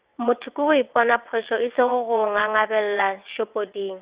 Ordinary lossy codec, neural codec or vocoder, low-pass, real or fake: Opus, 64 kbps; vocoder, 22.05 kHz, 80 mel bands, WaveNeXt; 3.6 kHz; fake